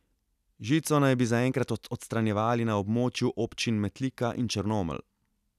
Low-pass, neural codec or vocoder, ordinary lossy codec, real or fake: 14.4 kHz; none; none; real